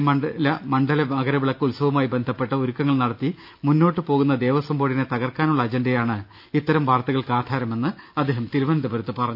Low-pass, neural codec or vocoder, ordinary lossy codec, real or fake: 5.4 kHz; none; none; real